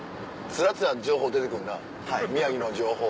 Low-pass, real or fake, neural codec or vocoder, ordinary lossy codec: none; real; none; none